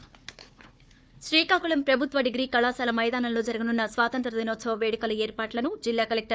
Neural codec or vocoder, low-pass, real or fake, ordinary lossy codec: codec, 16 kHz, 4 kbps, FunCodec, trained on Chinese and English, 50 frames a second; none; fake; none